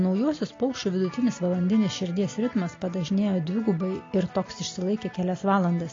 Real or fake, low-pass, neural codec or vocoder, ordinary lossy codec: real; 7.2 kHz; none; AAC, 32 kbps